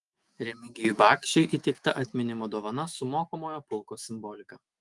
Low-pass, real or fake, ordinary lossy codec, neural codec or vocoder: 10.8 kHz; fake; Opus, 32 kbps; autoencoder, 48 kHz, 128 numbers a frame, DAC-VAE, trained on Japanese speech